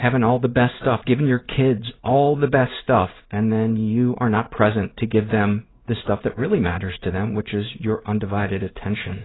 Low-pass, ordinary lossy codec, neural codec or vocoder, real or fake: 7.2 kHz; AAC, 16 kbps; codec, 16 kHz in and 24 kHz out, 1 kbps, XY-Tokenizer; fake